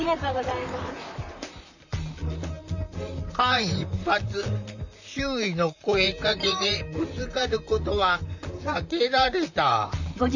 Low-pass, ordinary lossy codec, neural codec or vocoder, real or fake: 7.2 kHz; none; vocoder, 44.1 kHz, 128 mel bands, Pupu-Vocoder; fake